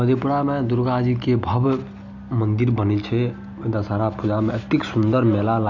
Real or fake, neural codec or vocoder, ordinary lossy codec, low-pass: real; none; none; 7.2 kHz